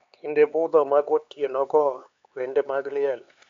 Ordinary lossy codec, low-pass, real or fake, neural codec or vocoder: MP3, 48 kbps; 7.2 kHz; fake; codec, 16 kHz, 4 kbps, X-Codec, HuBERT features, trained on LibriSpeech